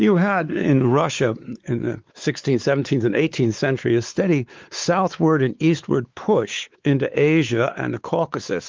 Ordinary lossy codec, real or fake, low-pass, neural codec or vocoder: Opus, 32 kbps; fake; 7.2 kHz; codec, 16 kHz, 4 kbps, X-Codec, WavLM features, trained on Multilingual LibriSpeech